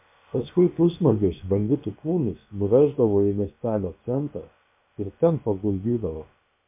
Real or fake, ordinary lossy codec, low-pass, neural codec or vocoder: fake; MP3, 32 kbps; 3.6 kHz; codec, 16 kHz, about 1 kbps, DyCAST, with the encoder's durations